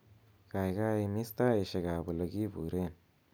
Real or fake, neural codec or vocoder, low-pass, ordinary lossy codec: real; none; none; none